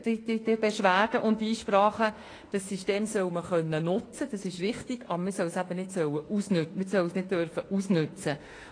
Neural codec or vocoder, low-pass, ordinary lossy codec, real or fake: autoencoder, 48 kHz, 32 numbers a frame, DAC-VAE, trained on Japanese speech; 9.9 kHz; AAC, 32 kbps; fake